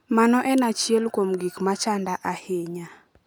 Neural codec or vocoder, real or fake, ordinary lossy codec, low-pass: none; real; none; none